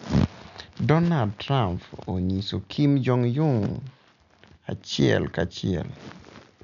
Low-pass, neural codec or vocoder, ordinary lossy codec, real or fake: 7.2 kHz; none; none; real